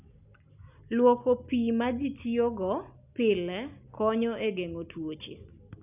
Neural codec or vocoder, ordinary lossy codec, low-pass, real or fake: none; none; 3.6 kHz; real